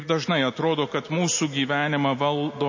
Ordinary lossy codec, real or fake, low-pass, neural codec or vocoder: MP3, 32 kbps; real; 7.2 kHz; none